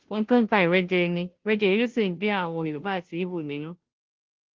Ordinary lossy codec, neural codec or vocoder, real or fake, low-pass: Opus, 16 kbps; codec, 16 kHz, 0.5 kbps, FunCodec, trained on Chinese and English, 25 frames a second; fake; 7.2 kHz